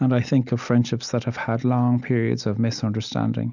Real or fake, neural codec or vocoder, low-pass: real; none; 7.2 kHz